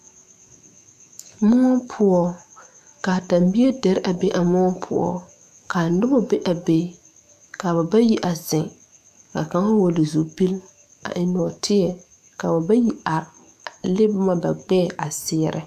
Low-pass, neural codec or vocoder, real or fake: 14.4 kHz; autoencoder, 48 kHz, 128 numbers a frame, DAC-VAE, trained on Japanese speech; fake